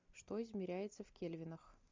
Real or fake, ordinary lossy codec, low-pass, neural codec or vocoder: real; MP3, 64 kbps; 7.2 kHz; none